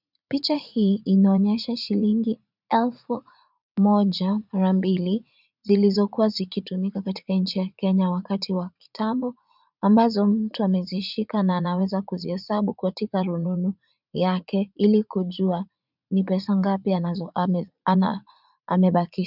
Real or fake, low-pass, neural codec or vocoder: fake; 5.4 kHz; vocoder, 44.1 kHz, 80 mel bands, Vocos